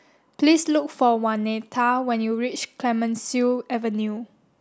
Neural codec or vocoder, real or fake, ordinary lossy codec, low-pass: none; real; none; none